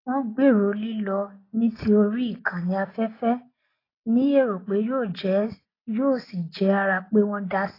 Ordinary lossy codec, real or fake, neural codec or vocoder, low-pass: AAC, 24 kbps; real; none; 5.4 kHz